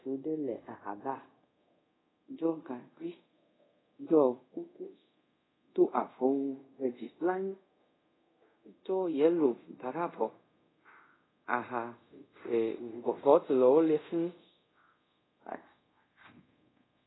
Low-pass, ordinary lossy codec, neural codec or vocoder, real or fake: 7.2 kHz; AAC, 16 kbps; codec, 24 kHz, 0.5 kbps, DualCodec; fake